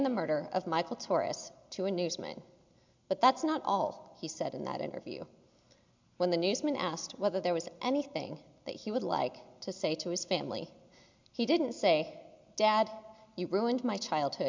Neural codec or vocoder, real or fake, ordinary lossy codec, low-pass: none; real; MP3, 64 kbps; 7.2 kHz